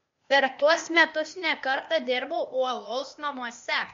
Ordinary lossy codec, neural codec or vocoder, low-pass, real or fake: MP3, 48 kbps; codec, 16 kHz, 0.8 kbps, ZipCodec; 7.2 kHz; fake